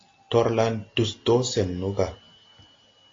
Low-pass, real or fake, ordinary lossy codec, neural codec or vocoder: 7.2 kHz; real; AAC, 32 kbps; none